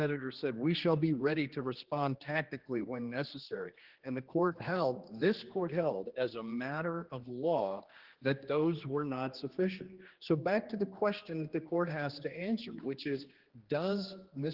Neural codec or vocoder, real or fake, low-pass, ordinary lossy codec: codec, 16 kHz, 2 kbps, X-Codec, HuBERT features, trained on general audio; fake; 5.4 kHz; Opus, 16 kbps